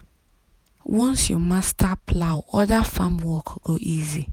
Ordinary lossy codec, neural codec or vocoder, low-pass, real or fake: none; vocoder, 48 kHz, 128 mel bands, Vocos; 19.8 kHz; fake